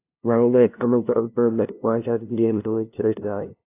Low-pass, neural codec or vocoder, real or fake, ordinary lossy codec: 3.6 kHz; codec, 16 kHz, 0.5 kbps, FunCodec, trained on LibriTTS, 25 frames a second; fake; AAC, 24 kbps